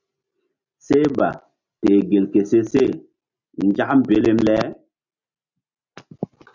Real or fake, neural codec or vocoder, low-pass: real; none; 7.2 kHz